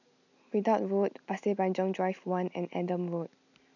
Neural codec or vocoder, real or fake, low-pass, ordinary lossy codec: none; real; 7.2 kHz; none